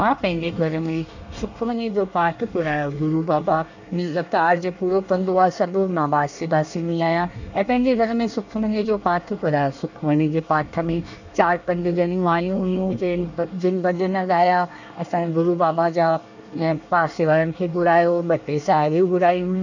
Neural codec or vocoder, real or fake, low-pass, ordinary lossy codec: codec, 24 kHz, 1 kbps, SNAC; fake; 7.2 kHz; none